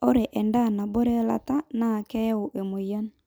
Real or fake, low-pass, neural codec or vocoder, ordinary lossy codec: real; none; none; none